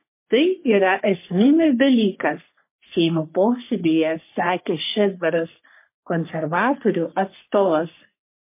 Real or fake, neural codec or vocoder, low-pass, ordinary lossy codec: fake; codec, 44.1 kHz, 3.4 kbps, Pupu-Codec; 3.6 kHz; MP3, 24 kbps